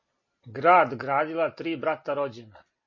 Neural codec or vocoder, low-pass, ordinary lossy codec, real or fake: none; 7.2 kHz; MP3, 32 kbps; real